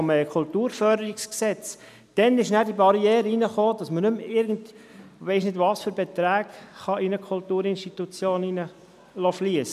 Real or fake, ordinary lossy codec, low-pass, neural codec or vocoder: real; none; 14.4 kHz; none